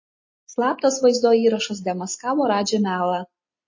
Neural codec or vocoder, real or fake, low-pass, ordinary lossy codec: none; real; 7.2 kHz; MP3, 32 kbps